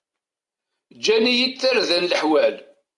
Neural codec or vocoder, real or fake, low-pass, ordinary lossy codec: vocoder, 44.1 kHz, 128 mel bands every 256 samples, BigVGAN v2; fake; 10.8 kHz; MP3, 96 kbps